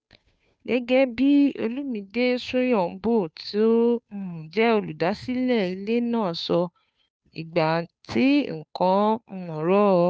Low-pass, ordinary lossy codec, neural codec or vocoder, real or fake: none; none; codec, 16 kHz, 2 kbps, FunCodec, trained on Chinese and English, 25 frames a second; fake